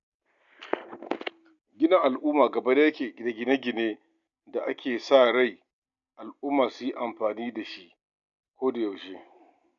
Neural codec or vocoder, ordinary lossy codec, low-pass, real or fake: none; none; 7.2 kHz; real